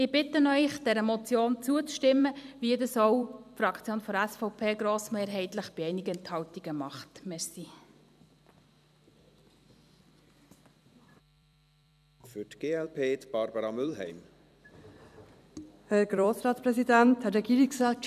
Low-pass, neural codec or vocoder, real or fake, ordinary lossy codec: 14.4 kHz; vocoder, 44.1 kHz, 128 mel bands every 256 samples, BigVGAN v2; fake; none